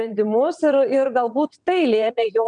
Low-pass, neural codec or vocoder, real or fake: 9.9 kHz; vocoder, 22.05 kHz, 80 mel bands, WaveNeXt; fake